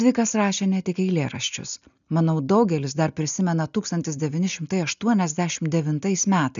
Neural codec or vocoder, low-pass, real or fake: none; 7.2 kHz; real